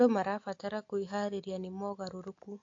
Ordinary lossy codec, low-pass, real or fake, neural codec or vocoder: AAC, 48 kbps; 7.2 kHz; real; none